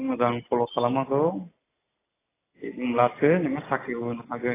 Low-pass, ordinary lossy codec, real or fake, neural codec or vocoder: 3.6 kHz; AAC, 16 kbps; real; none